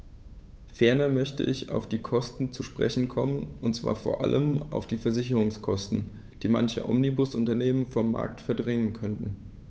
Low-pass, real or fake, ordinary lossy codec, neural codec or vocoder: none; fake; none; codec, 16 kHz, 8 kbps, FunCodec, trained on Chinese and English, 25 frames a second